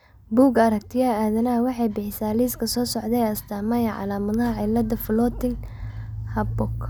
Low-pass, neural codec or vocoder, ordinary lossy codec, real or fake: none; none; none; real